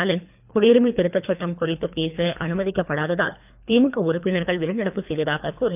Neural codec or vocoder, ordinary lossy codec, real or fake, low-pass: codec, 24 kHz, 3 kbps, HILCodec; none; fake; 3.6 kHz